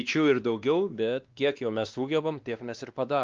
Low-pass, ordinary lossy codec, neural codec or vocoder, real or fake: 7.2 kHz; Opus, 24 kbps; codec, 16 kHz, 2 kbps, X-Codec, WavLM features, trained on Multilingual LibriSpeech; fake